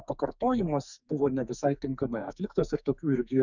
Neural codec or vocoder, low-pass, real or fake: codec, 44.1 kHz, 2.6 kbps, SNAC; 7.2 kHz; fake